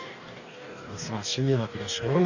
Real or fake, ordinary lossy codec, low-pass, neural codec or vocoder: fake; none; 7.2 kHz; codec, 44.1 kHz, 2.6 kbps, DAC